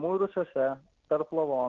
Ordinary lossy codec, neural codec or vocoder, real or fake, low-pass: Opus, 16 kbps; none; real; 7.2 kHz